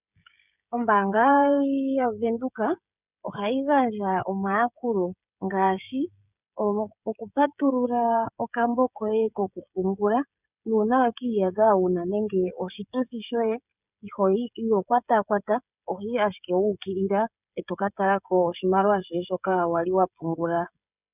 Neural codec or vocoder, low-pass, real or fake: codec, 16 kHz, 16 kbps, FreqCodec, smaller model; 3.6 kHz; fake